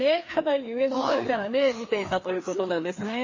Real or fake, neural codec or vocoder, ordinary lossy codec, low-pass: fake; codec, 16 kHz, 2 kbps, FreqCodec, larger model; MP3, 32 kbps; 7.2 kHz